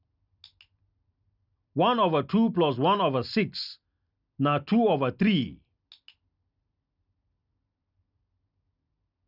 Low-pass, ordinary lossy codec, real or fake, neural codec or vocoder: 5.4 kHz; none; real; none